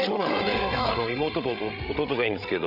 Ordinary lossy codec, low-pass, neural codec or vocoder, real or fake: none; 5.4 kHz; codec, 16 kHz, 16 kbps, FreqCodec, larger model; fake